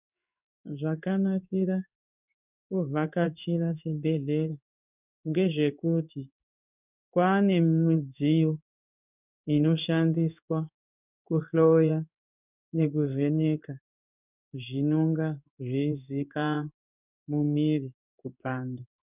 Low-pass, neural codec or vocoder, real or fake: 3.6 kHz; codec, 16 kHz in and 24 kHz out, 1 kbps, XY-Tokenizer; fake